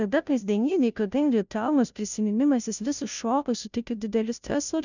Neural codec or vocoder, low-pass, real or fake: codec, 16 kHz, 0.5 kbps, FunCodec, trained on Chinese and English, 25 frames a second; 7.2 kHz; fake